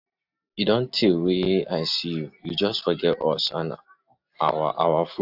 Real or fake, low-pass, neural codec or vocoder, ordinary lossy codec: real; 5.4 kHz; none; AAC, 48 kbps